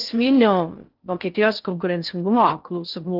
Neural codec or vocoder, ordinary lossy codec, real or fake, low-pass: codec, 16 kHz in and 24 kHz out, 0.6 kbps, FocalCodec, streaming, 4096 codes; Opus, 32 kbps; fake; 5.4 kHz